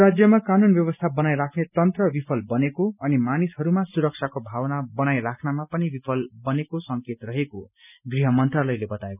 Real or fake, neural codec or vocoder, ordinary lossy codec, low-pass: real; none; none; 3.6 kHz